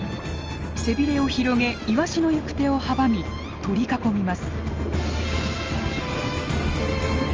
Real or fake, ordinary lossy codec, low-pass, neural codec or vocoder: real; Opus, 24 kbps; 7.2 kHz; none